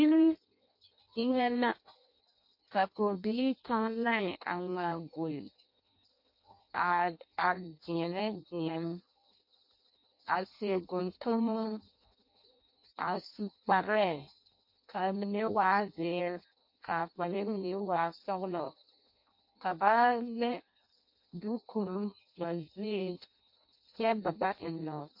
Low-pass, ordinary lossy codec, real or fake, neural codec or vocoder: 5.4 kHz; MP3, 32 kbps; fake; codec, 16 kHz in and 24 kHz out, 0.6 kbps, FireRedTTS-2 codec